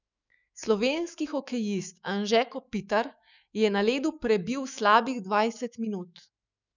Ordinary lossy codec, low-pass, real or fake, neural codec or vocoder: none; 7.2 kHz; fake; autoencoder, 48 kHz, 128 numbers a frame, DAC-VAE, trained on Japanese speech